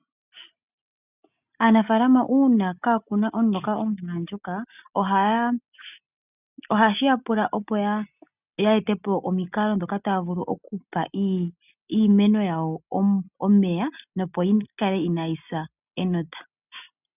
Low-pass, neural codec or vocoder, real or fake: 3.6 kHz; none; real